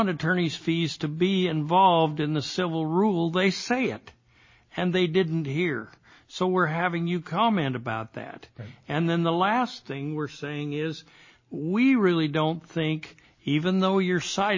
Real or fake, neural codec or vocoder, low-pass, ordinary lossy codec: real; none; 7.2 kHz; MP3, 32 kbps